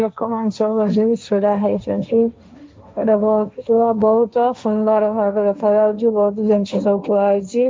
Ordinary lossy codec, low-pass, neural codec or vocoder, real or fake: none; none; codec, 16 kHz, 1.1 kbps, Voila-Tokenizer; fake